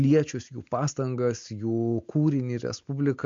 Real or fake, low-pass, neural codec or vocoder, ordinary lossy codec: real; 7.2 kHz; none; MP3, 48 kbps